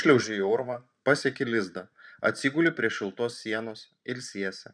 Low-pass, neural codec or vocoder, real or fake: 9.9 kHz; vocoder, 44.1 kHz, 128 mel bands every 512 samples, BigVGAN v2; fake